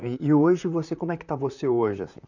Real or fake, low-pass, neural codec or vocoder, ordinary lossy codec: fake; 7.2 kHz; vocoder, 44.1 kHz, 128 mel bands, Pupu-Vocoder; none